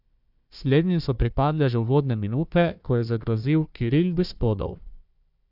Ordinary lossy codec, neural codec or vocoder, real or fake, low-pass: none; codec, 16 kHz, 1 kbps, FunCodec, trained on Chinese and English, 50 frames a second; fake; 5.4 kHz